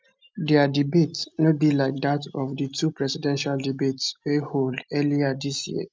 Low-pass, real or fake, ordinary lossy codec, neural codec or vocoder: none; real; none; none